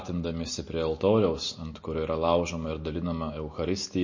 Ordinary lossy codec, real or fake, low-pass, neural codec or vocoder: MP3, 32 kbps; real; 7.2 kHz; none